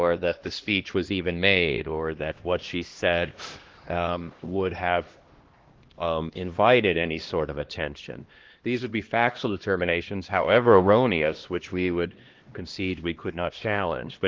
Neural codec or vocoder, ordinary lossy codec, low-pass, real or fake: codec, 16 kHz, 1 kbps, X-Codec, HuBERT features, trained on LibriSpeech; Opus, 16 kbps; 7.2 kHz; fake